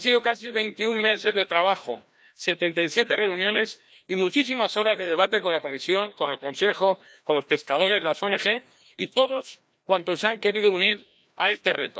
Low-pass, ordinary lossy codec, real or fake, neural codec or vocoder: none; none; fake; codec, 16 kHz, 1 kbps, FreqCodec, larger model